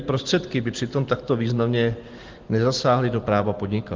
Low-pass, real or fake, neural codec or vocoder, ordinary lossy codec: 7.2 kHz; real; none; Opus, 16 kbps